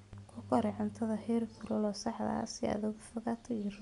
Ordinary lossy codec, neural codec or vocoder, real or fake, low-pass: none; none; real; 10.8 kHz